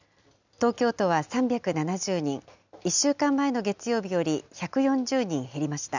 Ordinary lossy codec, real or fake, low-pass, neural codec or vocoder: none; real; 7.2 kHz; none